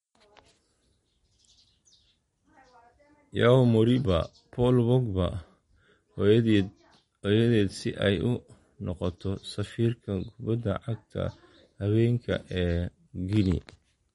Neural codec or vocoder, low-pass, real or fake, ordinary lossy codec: none; 19.8 kHz; real; MP3, 48 kbps